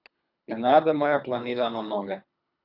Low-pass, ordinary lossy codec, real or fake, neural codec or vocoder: 5.4 kHz; none; fake; codec, 24 kHz, 3 kbps, HILCodec